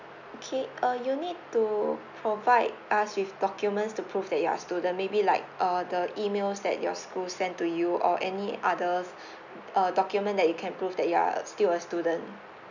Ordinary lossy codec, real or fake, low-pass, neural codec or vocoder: none; real; 7.2 kHz; none